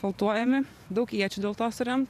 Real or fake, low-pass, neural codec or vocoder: fake; 14.4 kHz; vocoder, 44.1 kHz, 128 mel bands every 512 samples, BigVGAN v2